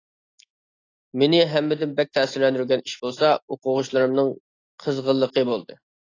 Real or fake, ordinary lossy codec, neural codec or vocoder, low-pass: real; AAC, 32 kbps; none; 7.2 kHz